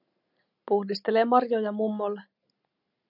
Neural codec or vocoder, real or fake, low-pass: none; real; 5.4 kHz